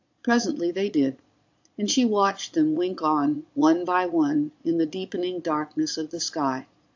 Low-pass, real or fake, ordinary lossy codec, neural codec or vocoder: 7.2 kHz; fake; AAC, 48 kbps; vocoder, 22.05 kHz, 80 mel bands, Vocos